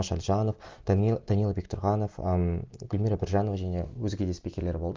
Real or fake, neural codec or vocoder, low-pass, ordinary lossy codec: real; none; 7.2 kHz; Opus, 32 kbps